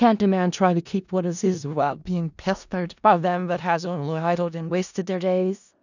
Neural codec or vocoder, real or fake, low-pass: codec, 16 kHz in and 24 kHz out, 0.4 kbps, LongCat-Audio-Codec, four codebook decoder; fake; 7.2 kHz